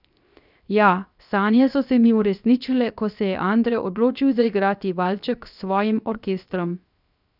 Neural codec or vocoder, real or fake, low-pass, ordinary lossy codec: codec, 16 kHz, 0.7 kbps, FocalCodec; fake; 5.4 kHz; none